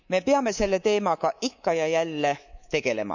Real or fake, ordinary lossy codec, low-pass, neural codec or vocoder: fake; none; 7.2 kHz; codec, 24 kHz, 3.1 kbps, DualCodec